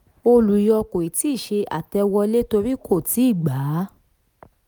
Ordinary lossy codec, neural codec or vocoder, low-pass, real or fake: none; none; none; real